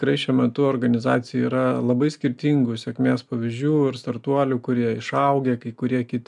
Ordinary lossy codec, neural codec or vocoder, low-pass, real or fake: MP3, 96 kbps; none; 10.8 kHz; real